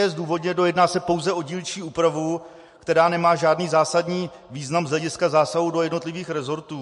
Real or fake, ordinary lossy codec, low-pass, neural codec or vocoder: real; MP3, 48 kbps; 14.4 kHz; none